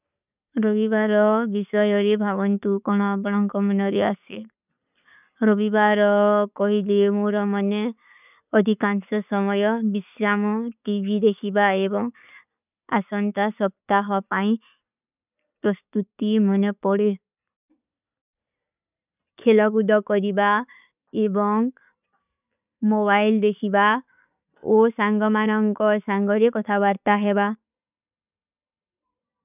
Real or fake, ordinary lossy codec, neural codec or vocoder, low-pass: real; none; none; 3.6 kHz